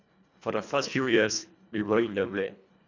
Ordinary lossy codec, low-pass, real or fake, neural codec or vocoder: none; 7.2 kHz; fake; codec, 24 kHz, 1.5 kbps, HILCodec